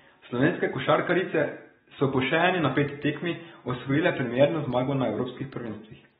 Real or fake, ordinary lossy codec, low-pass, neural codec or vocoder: real; AAC, 16 kbps; 19.8 kHz; none